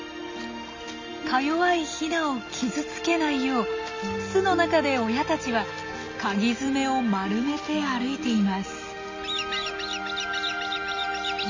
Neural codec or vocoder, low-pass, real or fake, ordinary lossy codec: none; 7.2 kHz; real; MP3, 48 kbps